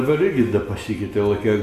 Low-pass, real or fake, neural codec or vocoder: 14.4 kHz; real; none